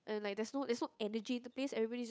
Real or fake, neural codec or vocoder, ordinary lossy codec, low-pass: fake; codec, 16 kHz, 8 kbps, FunCodec, trained on Chinese and English, 25 frames a second; none; none